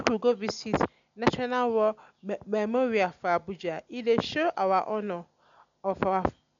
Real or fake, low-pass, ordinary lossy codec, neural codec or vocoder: real; 7.2 kHz; MP3, 64 kbps; none